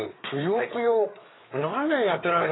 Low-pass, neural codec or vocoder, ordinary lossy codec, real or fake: 7.2 kHz; codec, 16 kHz, 4 kbps, FreqCodec, larger model; AAC, 16 kbps; fake